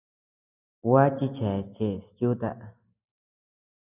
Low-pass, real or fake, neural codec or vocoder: 3.6 kHz; real; none